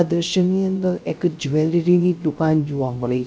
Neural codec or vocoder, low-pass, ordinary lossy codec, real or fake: codec, 16 kHz, 0.3 kbps, FocalCodec; none; none; fake